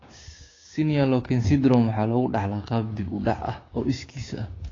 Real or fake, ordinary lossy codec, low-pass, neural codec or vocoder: fake; AAC, 32 kbps; 7.2 kHz; codec, 16 kHz, 6 kbps, DAC